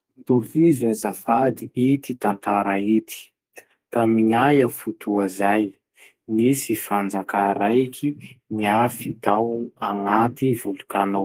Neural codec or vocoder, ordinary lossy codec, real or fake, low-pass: codec, 32 kHz, 1.9 kbps, SNAC; Opus, 24 kbps; fake; 14.4 kHz